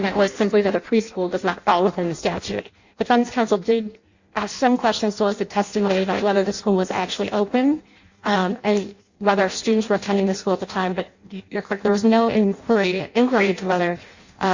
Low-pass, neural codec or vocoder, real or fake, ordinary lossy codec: 7.2 kHz; codec, 16 kHz in and 24 kHz out, 0.6 kbps, FireRedTTS-2 codec; fake; Opus, 64 kbps